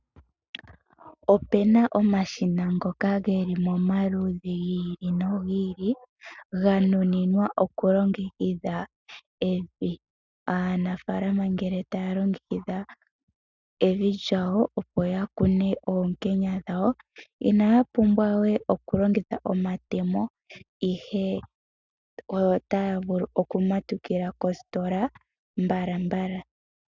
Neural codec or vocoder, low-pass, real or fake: none; 7.2 kHz; real